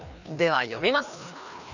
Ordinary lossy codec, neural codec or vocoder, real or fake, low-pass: none; codec, 16 kHz, 2 kbps, FreqCodec, larger model; fake; 7.2 kHz